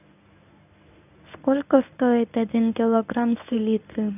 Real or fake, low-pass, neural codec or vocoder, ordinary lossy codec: fake; 3.6 kHz; codec, 24 kHz, 0.9 kbps, WavTokenizer, medium speech release version 1; none